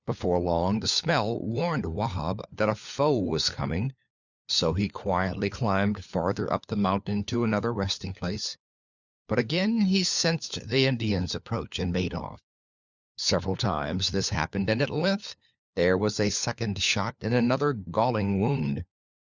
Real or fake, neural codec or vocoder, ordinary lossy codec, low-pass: fake; codec, 16 kHz, 4 kbps, FunCodec, trained on LibriTTS, 50 frames a second; Opus, 64 kbps; 7.2 kHz